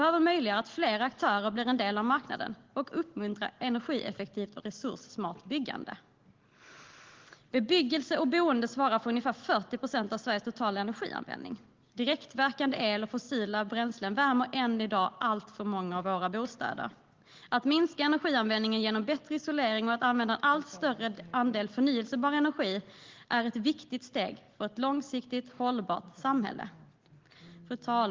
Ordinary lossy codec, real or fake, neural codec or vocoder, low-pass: Opus, 16 kbps; real; none; 7.2 kHz